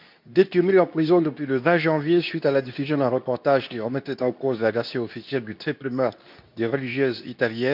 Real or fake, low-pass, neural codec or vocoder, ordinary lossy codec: fake; 5.4 kHz; codec, 24 kHz, 0.9 kbps, WavTokenizer, medium speech release version 2; none